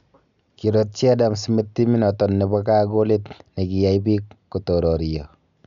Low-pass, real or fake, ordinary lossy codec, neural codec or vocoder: 7.2 kHz; real; none; none